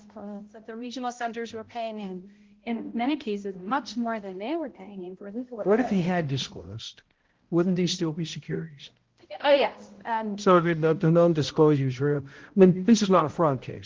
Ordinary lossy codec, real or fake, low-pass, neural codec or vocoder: Opus, 16 kbps; fake; 7.2 kHz; codec, 16 kHz, 0.5 kbps, X-Codec, HuBERT features, trained on balanced general audio